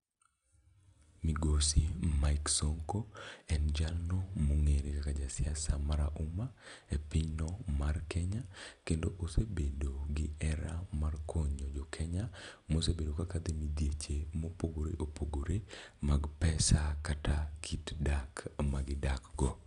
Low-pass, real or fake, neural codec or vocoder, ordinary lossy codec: 10.8 kHz; real; none; none